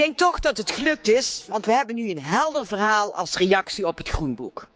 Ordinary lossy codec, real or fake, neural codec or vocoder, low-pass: none; fake; codec, 16 kHz, 4 kbps, X-Codec, HuBERT features, trained on general audio; none